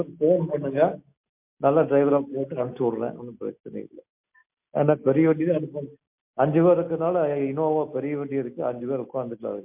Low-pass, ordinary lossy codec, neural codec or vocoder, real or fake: 3.6 kHz; MP3, 32 kbps; none; real